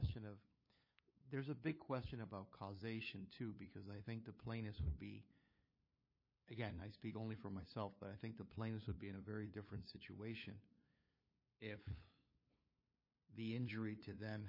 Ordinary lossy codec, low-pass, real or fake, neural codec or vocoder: MP3, 24 kbps; 5.4 kHz; fake; codec, 16 kHz, 8 kbps, FunCodec, trained on LibriTTS, 25 frames a second